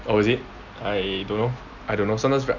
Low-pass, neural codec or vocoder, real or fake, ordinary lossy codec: 7.2 kHz; none; real; none